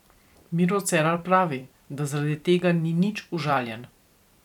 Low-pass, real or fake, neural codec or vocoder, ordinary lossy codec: 19.8 kHz; fake; vocoder, 44.1 kHz, 128 mel bands every 512 samples, BigVGAN v2; none